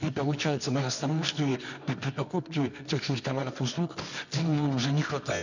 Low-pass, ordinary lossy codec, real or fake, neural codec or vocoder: 7.2 kHz; none; fake; codec, 24 kHz, 0.9 kbps, WavTokenizer, medium music audio release